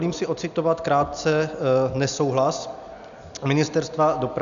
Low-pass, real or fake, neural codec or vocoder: 7.2 kHz; real; none